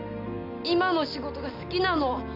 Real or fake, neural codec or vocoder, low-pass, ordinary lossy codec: real; none; 5.4 kHz; none